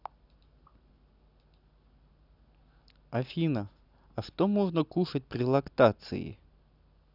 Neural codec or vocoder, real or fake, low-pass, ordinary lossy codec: none; real; 5.4 kHz; none